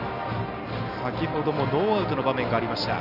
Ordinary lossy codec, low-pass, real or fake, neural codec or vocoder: none; 5.4 kHz; real; none